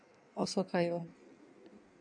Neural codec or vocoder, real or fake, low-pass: codec, 16 kHz in and 24 kHz out, 1.1 kbps, FireRedTTS-2 codec; fake; 9.9 kHz